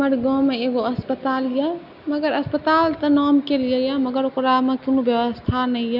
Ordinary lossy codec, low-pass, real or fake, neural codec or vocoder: none; 5.4 kHz; real; none